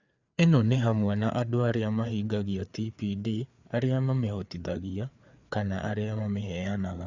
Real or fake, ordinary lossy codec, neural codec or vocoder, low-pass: fake; Opus, 64 kbps; codec, 16 kHz, 4 kbps, FreqCodec, larger model; 7.2 kHz